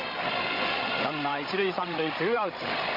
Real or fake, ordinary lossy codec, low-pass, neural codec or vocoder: fake; MP3, 32 kbps; 5.4 kHz; codec, 16 kHz, 16 kbps, FreqCodec, larger model